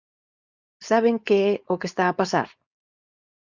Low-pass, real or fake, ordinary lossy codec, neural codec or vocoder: 7.2 kHz; fake; Opus, 64 kbps; codec, 16 kHz, 4.8 kbps, FACodec